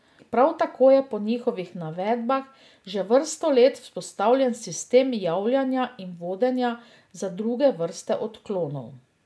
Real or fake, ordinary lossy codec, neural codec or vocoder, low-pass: real; none; none; none